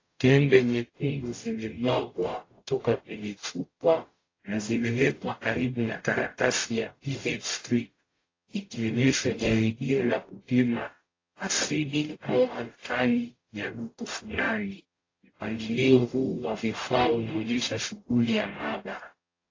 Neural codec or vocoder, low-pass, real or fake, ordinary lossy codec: codec, 44.1 kHz, 0.9 kbps, DAC; 7.2 kHz; fake; AAC, 32 kbps